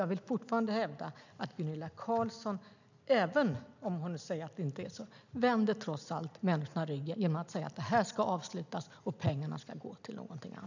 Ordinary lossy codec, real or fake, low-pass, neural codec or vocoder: none; real; 7.2 kHz; none